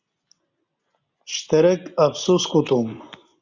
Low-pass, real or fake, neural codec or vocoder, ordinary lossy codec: 7.2 kHz; real; none; Opus, 64 kbps